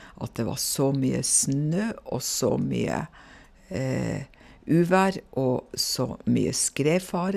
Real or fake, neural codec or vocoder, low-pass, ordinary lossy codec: real; none; 14.4 kHz; none